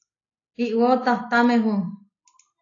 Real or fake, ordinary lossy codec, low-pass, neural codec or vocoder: real; AAC, 32 kbps; 7.2 kHz; none